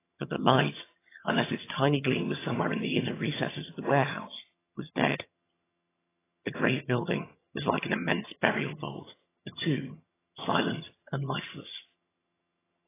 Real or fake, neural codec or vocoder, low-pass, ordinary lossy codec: fake; vocoder, 22.05 kHz, 80 mel bands, HiFi-GAN; 3.6 kHz; AAC, 16 kbps